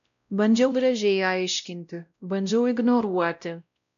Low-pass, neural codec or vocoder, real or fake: 7.2 kHz; codec, 16 kHz, 0.5 kbps, X-Codec, WavLM features, trained on Multilingual LibriSpeech; fake